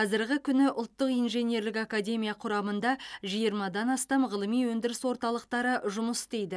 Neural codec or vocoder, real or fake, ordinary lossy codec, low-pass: none; real; none; none